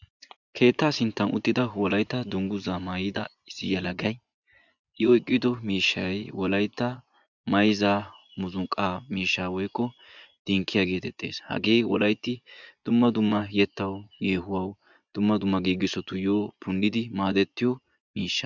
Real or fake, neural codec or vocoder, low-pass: fake; vocoder, 24 kHz, 100 mel bands, Vocos; 7.2 kHz